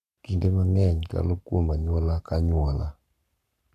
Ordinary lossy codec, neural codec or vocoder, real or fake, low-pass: none; codec, 44.1 kHz, 7.8 kbps, Pupu-Codec; fake; 14.4 kHz